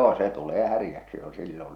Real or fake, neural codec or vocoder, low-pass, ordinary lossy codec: real; none; 19.8 kHz; none